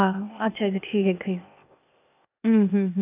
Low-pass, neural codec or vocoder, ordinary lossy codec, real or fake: 3.6 kHz; codec, 16 kHz, 0.8 kbps, ZipCodec; none; fake